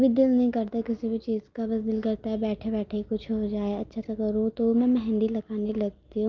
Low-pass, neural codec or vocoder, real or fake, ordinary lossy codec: 7.2 kHz; none; real; Opus, 24 kbps